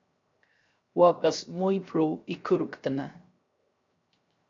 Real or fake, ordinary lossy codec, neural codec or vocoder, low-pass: fake; AAC, 48 kbps; codec, 16 kHz, 0.7 kbps, FocalCodec; 7.2 kHz